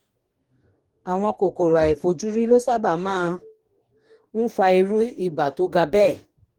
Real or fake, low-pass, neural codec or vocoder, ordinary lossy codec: fake; 19.8 kHz; codec, 44.1 kHz, 2.6 kbps, DAC; Opus, 24 kbps